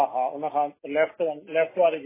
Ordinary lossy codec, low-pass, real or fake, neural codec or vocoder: MP3, 16 kbps; 3.6 kHz; real; none